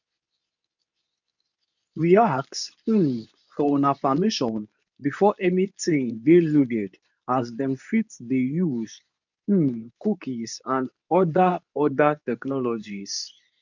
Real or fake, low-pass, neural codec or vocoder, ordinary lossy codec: fake; 7.2 kHz; codec, 24 kHz, 0.9 kbps, WavTokenizer, medium speech release version 2; none